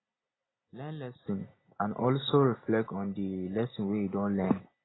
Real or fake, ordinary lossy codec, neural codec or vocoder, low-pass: real; AAC, 16 kbps; none; 7.2 kHz